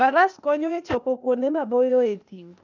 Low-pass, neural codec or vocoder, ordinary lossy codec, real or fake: 7.2 kHz; codec, 16 kHz, 0.8 kbps, ZipCodec; none; fake